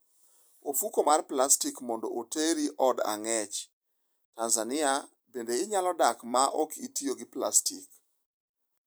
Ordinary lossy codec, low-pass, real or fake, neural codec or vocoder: none; none; fake; vocoder, 44.1 kHz, 128 mel bands every 256 samples, BigVGAN v2